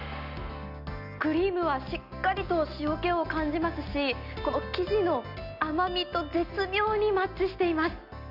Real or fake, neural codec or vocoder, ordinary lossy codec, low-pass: real; none; none; 5.4 kHz